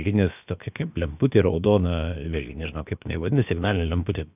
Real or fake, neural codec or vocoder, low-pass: fake; codec, 16 kHz, about 1 kbps, DyCAST, with the encoder's durations; 3.6 kHz